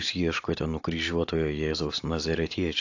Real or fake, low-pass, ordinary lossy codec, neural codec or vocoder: real; 7.2 kHz; AAC, 48 kbps; none